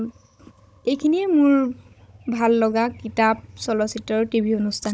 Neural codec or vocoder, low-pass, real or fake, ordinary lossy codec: codec, 16 kHz, 16 kbps, FunCodec, trained on Chinese and English, 50 frames a second; none; fake; none